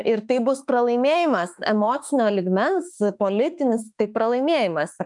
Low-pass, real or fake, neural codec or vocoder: 10.8 kHz; fake; autoencoder, 48 kHz, 32 numbers a frame, DAC-VAE, trained on Japanese speech